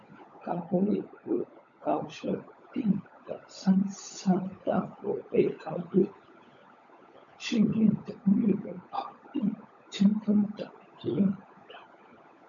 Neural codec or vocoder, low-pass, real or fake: codec, 16 kHz, 16 kbps, FunCodec, trained on LibriTTS, 50 frames a second; 7.2 kHz; fake